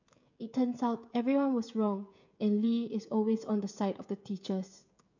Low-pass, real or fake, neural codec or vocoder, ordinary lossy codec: 7.2 kHz; fake; codec, 16 kHz, 16 kbps, FreqCodec, smaller model; none